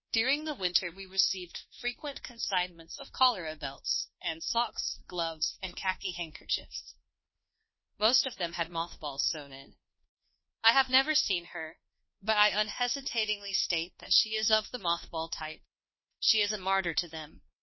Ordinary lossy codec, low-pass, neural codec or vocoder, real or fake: MP3, 24 kbps; 7.2 kHz; codec, 24 kHz, 1.2 kbps, DualCodec; fake